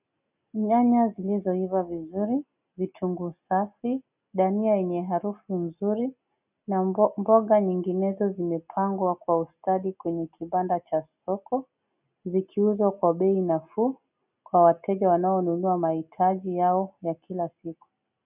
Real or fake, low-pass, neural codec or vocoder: real; 3.6 kHz; none